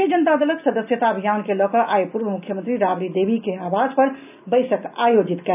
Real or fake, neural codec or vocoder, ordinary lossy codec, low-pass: real; none; none; 3.6 kHz